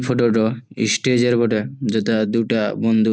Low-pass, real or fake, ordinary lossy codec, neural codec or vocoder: none; real; none; none